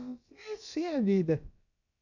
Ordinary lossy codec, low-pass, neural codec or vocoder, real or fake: none; 7.2 kHz; codec, 16 kHz, about 1 kbps, DyCAST, with the encoder's durations; fake